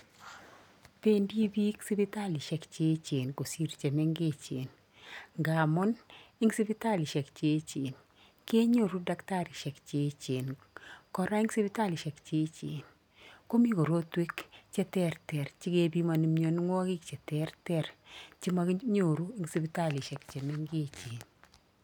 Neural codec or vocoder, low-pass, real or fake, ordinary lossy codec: none; 19.8 kHz; real; none